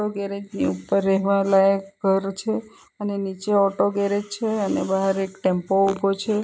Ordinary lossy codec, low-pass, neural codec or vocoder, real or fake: none; none; none; real